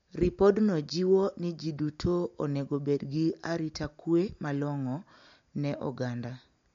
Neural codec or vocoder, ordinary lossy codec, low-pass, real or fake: none; MP3, 48 kbps; 7.2 kHz; real